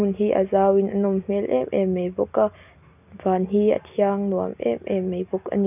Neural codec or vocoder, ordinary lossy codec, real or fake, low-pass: none; none; real; 3.6 kHz